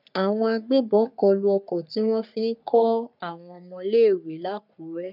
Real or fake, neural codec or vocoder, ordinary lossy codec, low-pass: fake; codec, 44.1 kHz, 3.4 kbps, Pupu-Codec; none; 5.4 kHz